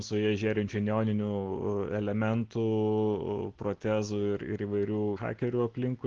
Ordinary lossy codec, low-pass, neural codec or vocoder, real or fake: Opus, 16 kbps; 7.2 kHz; none; real